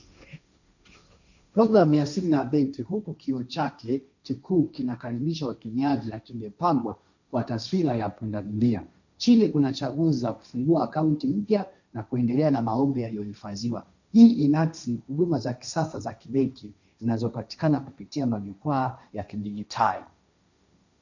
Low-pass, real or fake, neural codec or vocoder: 7.2 kHz; fake; codec, 16 kHz, 1.1 kbps, Voila-Tokenizer